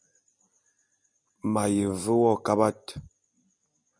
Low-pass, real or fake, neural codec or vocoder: 9.9 kHz; real; none